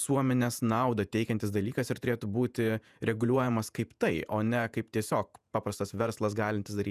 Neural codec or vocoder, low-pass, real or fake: vocoder, 48 kHz, 128 mel bands, Vocos; 14.4 kHz; fake